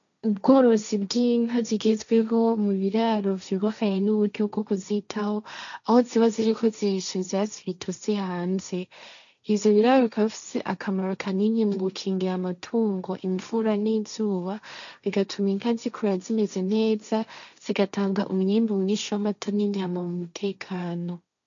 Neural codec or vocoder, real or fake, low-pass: codec, 16 kHz, 1.1 kbps, Voila-Tokenizer; fake; 7.2 kHz